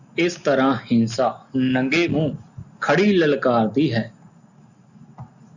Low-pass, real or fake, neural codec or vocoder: 7.2 kHz; real; none